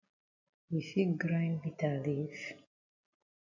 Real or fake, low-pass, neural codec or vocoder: real; 7.2 kHz; none